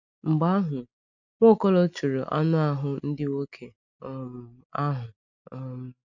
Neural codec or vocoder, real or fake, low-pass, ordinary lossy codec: none; real; 7.2 kHz; none